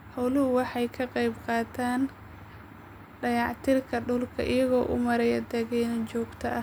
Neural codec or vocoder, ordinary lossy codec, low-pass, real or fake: none; none; none; real